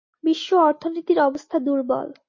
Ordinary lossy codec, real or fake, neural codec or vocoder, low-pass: MP3, 32 kbps; real; none; 7.2 kHz